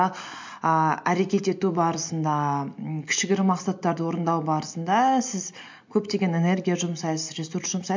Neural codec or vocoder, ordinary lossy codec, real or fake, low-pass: none; MP3, 48 kbps; real; 7.2 kHz